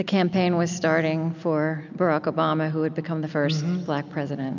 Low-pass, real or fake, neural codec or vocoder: 7.2 kHz; real; none